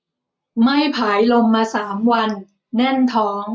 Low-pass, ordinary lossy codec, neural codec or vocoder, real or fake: none; none; none; real